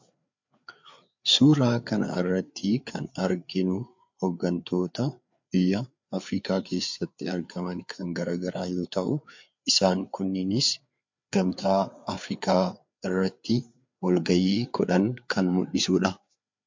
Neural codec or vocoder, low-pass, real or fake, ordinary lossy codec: codec, 16 kHz, 4 kbps, FreqCodec, larger model; 7.2 kHz; fake; MP3, 48 kbps